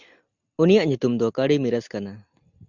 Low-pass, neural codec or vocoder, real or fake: 7.2 kHz; none; real